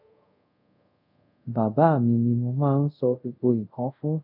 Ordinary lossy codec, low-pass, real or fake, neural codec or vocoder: none; 5.4 kHz; fake; codec, 24 kHz, 0.5 kbps, DualCodec